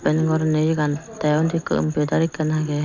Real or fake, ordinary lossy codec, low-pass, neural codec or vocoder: real; none; 7.2 kHz; none